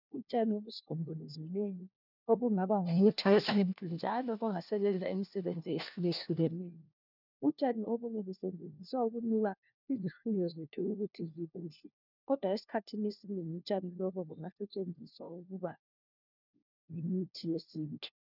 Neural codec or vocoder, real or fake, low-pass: codec, 16 kHz, 1 kbps, FunCodec, trained on LibriTTS, 50 frames a second; fake; 5.4 kHz